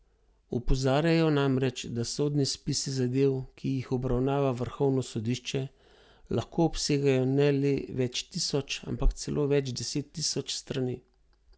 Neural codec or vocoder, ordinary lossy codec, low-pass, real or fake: none; none; none; real